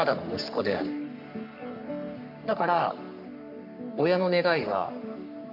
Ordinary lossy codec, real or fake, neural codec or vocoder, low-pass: AAC, 48 kbps; fake; codec, 44.1 kHz, 3.4 kbps, Pupu-Codec; 5.4 kHz